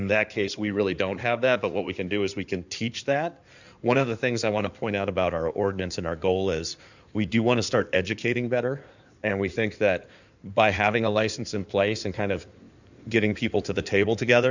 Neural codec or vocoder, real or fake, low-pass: codec, 16 kHz in and 24 kHz out, 2.2 kbps, FireRedTTS-2 codec; fake; 7.2 kHz